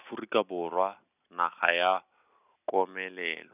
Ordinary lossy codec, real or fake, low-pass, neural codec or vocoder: none; real; 3.6 kHz; none